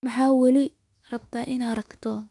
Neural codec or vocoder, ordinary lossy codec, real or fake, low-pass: autoencoder, 48 kHz, 32 numbers a frame, DAC-VAE, trained on Japanese speech; none; fake; 10.8 kHz